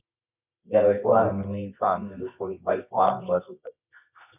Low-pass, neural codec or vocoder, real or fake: 3.6 kHz; codec, 24 kHz, 0.9 kbps, WavTokenizer, medium music audio release; fake